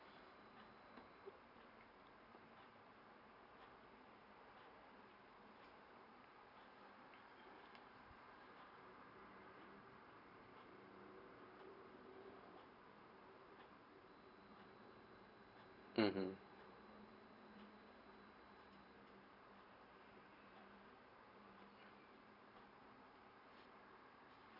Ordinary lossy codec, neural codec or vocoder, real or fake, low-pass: none; vocoder, 44.1 kHz, 128 mel bands every 512 samples, BigVGAN v2; fake; 5.4 kHz